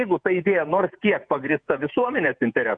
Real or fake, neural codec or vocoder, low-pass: fake; vocoder, 48 kHz, 128 mel bands, Vocos; 9.9 kHz